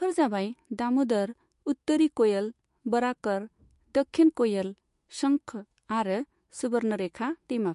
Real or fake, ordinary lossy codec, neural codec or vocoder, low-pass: fake; MP3, 48 kbps; autoencoder, 48 kHz, 128 numbers a frame, DAC-VAE, trained on Japanese speech; 14.4 kHz